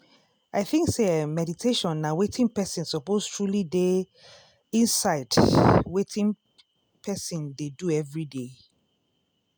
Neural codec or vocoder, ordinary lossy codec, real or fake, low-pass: none; none; real; none